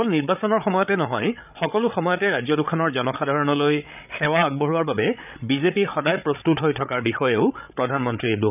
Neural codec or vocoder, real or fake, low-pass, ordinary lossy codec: codec, 16 kHz, 8 kbps, FreqCodec, larger model; fake; 3.6 kHz; none